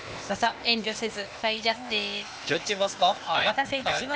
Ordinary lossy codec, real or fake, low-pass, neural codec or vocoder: none; fake; none; codec, 16 kHz, 0.8 kbps, ZipCodec